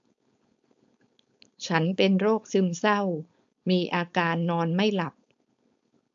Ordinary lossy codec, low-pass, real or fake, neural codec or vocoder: AAC, 64 kbps; 7.2 kHz; fake; codec, 16 kHz, 4.8 kbps, FACodec